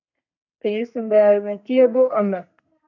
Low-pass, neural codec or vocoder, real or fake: 7.2 kHz; codec, 32 kHz, 1.9 kbps, SNAC; fake